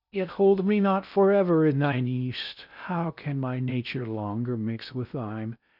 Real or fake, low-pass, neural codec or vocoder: fake; 5.4 kHz; codec, 16 kHz in and 24 kHz out, 0.6 kbps, FocalCodec, streaming, 4096 codes